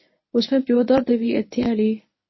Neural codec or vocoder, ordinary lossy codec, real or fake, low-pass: codec, 24 kHz, 0.9 kbps, WavTokenizer, medium speech release version 1; MP3, 24 kbps; fake; 7.2 kHz